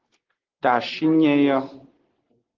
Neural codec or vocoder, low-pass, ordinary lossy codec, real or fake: none; 7.2 kHz; Opus, 16 kbps; real